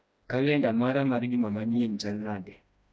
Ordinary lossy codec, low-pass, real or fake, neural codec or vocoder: none; none; fake; codec, 16 kHz, 1 kbps, FreqCodec, smaller model